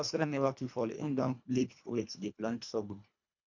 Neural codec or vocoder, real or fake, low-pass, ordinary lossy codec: codec, 24 kHz, 1.5 kbps, HILCodec; fake; 7.2 kHz; none